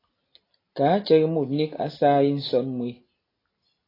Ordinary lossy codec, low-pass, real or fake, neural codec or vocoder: AAC, 24 kbps; 5.4 kHz; real; none